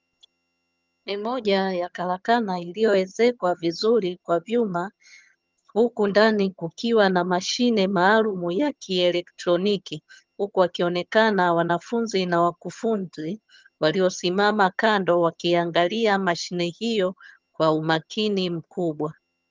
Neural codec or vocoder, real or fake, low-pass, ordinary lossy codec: vocoder, 22.05 kHz, 80 mel bands, HiFi-GAN; fake; 7.2 kHz; Opus, 32 kbps